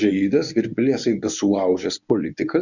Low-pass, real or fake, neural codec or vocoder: 7.2 kHz; fake; codec, 24 kHz, 0.9 kbps, WavTokenizer, medium speech release version 1